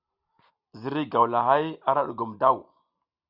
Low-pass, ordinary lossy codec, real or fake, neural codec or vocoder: 5.4 kHz; Opus, 64 kbps; real; none